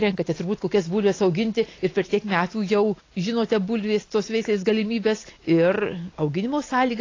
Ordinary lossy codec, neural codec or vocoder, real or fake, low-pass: AAC, 32 kbps; none; real; 7.2 kHz